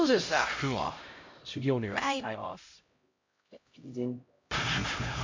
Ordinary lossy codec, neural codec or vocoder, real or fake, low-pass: MP3, 48 kbps; codec, 16 kHz, 0.5 kbps, X-Codec, HuBERT features, trained on LibriSpeech; fake; 7.2 kHz